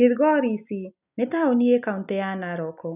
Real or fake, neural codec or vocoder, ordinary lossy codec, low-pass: real; none; none; 3.6 kHz